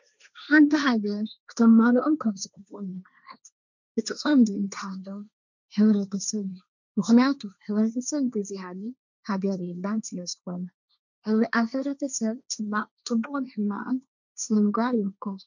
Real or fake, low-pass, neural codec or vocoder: fake; 7.2 kHz; codec, 16 kHz, 1.1 kbps, Voila-Tokenizer